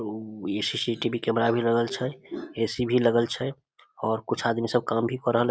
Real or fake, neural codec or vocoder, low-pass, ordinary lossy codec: real; none; none; none